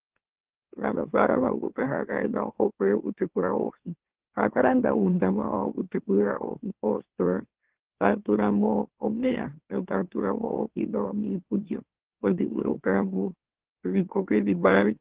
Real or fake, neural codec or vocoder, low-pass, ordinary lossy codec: fake; autoencoder, 44.1 kHz, a latent of 192 numbers a frame, MeloTTS; 3.6 kHz; Opus, 16 kbps